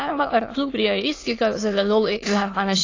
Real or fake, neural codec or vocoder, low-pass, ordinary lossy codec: fake; autoencoder, 22.05 kHz, a latent of 192 numbers a frame, VITS, trained on many speakers; 7.2 kHz; AAC, 32 kbps